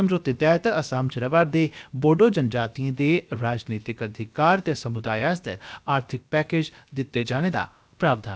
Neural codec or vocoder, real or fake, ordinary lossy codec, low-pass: codec, 16 kHz, about 1 kbps, DyCAST, with the encoder's durations; fake; none; none